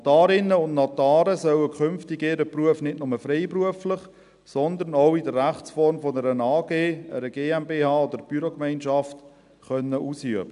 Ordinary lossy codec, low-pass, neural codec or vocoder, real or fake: none; 10.8 kHz; none; real